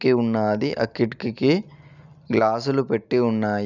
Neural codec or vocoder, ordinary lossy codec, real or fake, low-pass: none; none; real; 7.2 kHz